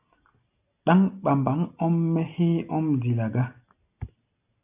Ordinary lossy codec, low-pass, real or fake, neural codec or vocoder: AAC, 32 kbps; 3.6 kHz; real; none